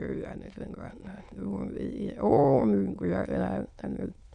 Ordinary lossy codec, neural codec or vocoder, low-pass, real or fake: none; autoencoder, 22.05 kHz, a latent of 192 numbers a frame, VITS, trained on many speakers; 9.9 kHz; fake